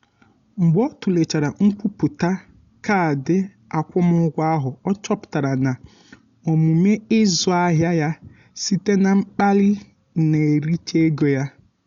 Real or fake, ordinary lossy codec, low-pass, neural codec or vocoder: real; none; 7.2 kHz; none